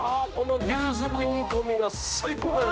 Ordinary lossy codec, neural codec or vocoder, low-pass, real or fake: none; codec, 16 kHz, 1 kbps, X-Codec, HuBERT features, trained on general audio; none; fake